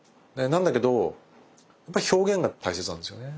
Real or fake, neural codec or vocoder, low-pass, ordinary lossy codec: real; none; none; none